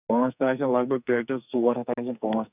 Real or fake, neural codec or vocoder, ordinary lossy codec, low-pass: fake; codec, 44.1 kHz, 2.6 kbps, SNAC; none; 3.6 kHz